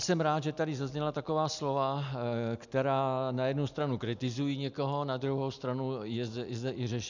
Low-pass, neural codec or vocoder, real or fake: 7.2 kHz; none; real